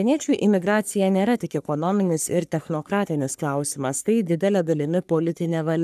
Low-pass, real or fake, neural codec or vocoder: 14.4 kHz; fake; codec, 44.1 kHz, 3.4 kbps, Pupu-Codec